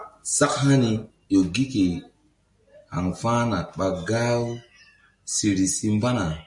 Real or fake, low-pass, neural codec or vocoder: real; 10.8 kHz; none